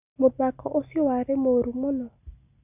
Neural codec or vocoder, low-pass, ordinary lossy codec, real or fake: none; 3.6 kHz; none; real